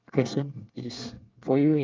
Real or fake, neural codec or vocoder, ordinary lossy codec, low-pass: fake; codec, 24 kHz, 1 kbps, SNAC; Opus, 32 kbps; 7.2 kHz